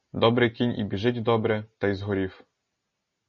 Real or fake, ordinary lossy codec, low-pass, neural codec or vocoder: real; MP3, 32 kbps; 7.2 kHz; none